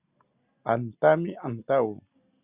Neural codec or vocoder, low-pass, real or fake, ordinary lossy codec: none; 3.6 kHz; real; Opus, 64 kbps